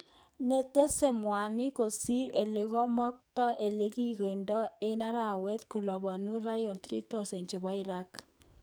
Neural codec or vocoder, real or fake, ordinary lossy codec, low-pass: codec, 44.1 kHz, 2.6 kbps, SNAC; fake; none; none